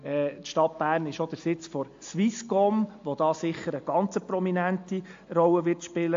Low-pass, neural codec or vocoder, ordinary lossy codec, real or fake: 7.2 kHz; none; AAC, 48 kbps; real